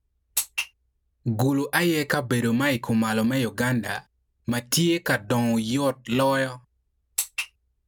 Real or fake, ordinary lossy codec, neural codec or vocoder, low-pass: real; none; none; none